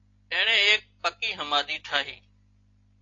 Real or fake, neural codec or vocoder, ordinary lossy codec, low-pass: real; none; AAC, 32 kbps; 7.2 kHz